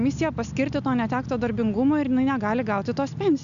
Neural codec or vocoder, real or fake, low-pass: none; real; 7.2 kHz